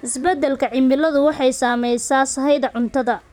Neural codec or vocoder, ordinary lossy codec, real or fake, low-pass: vocoder, 44.1 kHz, 128 mel bands, Pupu-Vocoder; none; fake; 19.8 kHz